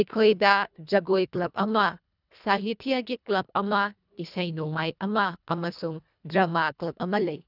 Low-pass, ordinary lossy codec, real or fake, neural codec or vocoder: 5.4 kHz; none; fake; codec, 24 kHz, 1.5 kbps, HILCodec